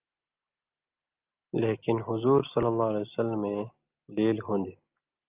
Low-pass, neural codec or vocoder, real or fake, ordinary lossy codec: 3.6 kHz; none; real; Opus, 24 kbps